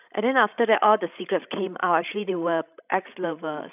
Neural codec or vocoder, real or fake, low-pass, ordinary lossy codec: codec, 16 kHz, 16 kbps, FreqCodec, larger model; fake; 3.6 kHz; none